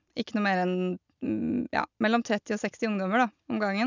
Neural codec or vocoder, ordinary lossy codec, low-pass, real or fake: none; none; 7.2 kHz; real